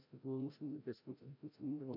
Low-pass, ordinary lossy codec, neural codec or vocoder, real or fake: 5.4 kHz; MP3, 48 kbps; codec, 16 kHz, 0.5 kbps, FreqCodec, larger model; fake